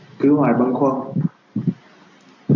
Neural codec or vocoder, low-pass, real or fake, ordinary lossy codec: none; 7.2 kHz; real; AAC, 48 kbps